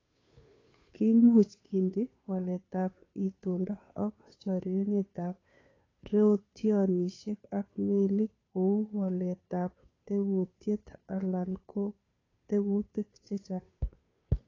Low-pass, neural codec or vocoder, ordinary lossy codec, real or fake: 7.2 kHz; codec, 16 kHz, 2 kbps, FunCodec, trained on Chinese and English, 25 frames a second; AAC, 32 kbps; fake